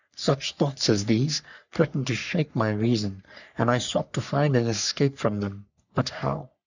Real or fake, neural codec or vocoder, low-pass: fake; codec, 44.1 kHz, 3.4 kbps, Pupu-Codec; 7.2 kHz